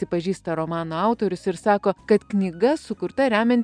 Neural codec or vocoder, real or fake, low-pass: none; real; 9.9 kHz